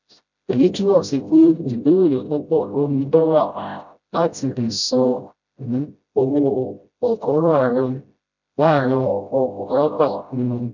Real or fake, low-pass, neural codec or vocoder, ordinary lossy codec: fake; 7.2 kHz; codec, 16 kHz, 0.5 kbps, FreqCodec, smaller model; none